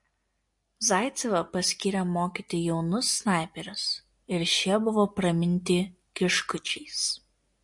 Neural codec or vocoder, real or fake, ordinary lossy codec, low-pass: none; real; MP3, 48 kbps; 10.8 kHz